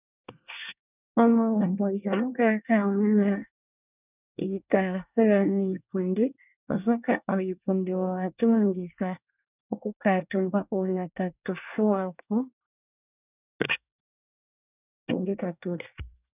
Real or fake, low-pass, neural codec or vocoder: fake; 3.6 kHz; codec, 24 kHz, 1 kbps, SNAC